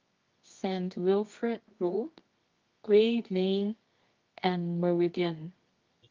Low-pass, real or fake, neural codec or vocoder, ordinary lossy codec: 7.2 kHz; fake; codec, 24 kHz, 0.9 kbps, WavTokenizer, medium music audio release; Opus, 24 kbps